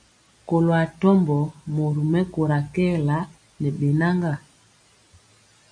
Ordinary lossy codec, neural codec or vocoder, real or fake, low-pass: MP3, 64 kbps; none; real; 9.9 kHz